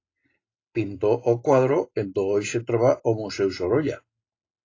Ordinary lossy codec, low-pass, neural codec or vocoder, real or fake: AAC, 32 kbps; 7.2 kHz; none; real